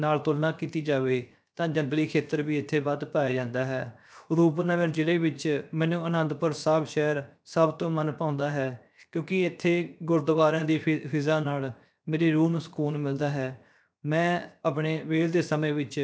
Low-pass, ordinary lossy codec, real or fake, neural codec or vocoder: none; none; fake; codec, 16 kHz, 0.7 kbps, FocalCodec